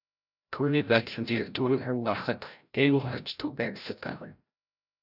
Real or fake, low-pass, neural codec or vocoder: fake; 5.4 kHz; codec, 16 kHz, 0.5 kbps, FreqCodec, larger model